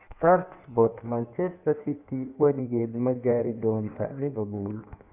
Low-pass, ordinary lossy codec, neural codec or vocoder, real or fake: 3.6 kHz; none; codec, 16 kHz in and 24 kHz out, 1.1 kbps, FireRedTTS-2 codec; fake